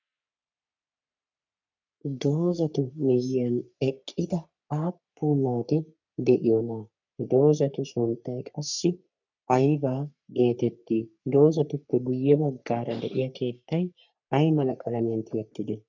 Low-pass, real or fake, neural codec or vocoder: 7.2 kHz; fake; codec, 44.1 kHz, 3.4 kbps, Pupu-Codec